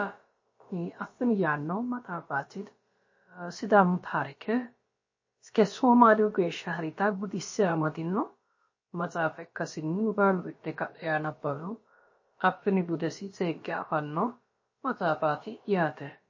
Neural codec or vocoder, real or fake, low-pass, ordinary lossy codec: codec, 16 kHz, about 1 kbps, DyCAST, with the encoder's durations; fake; 7.2 kHz; MP3, 32 kbps